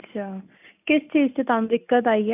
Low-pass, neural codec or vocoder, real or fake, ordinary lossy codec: 3.6 kHz; none; real; none